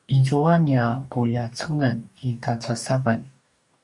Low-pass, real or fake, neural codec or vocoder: 10.8 kHz; fake; codec, 44.1 kHz, 2.6 kbps, DAC